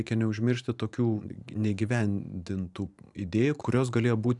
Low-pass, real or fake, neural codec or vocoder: 10.8 kHz; fake; vocoder, 44.1 kHz, 128 mel bands every 512 samples, BigVGAN v2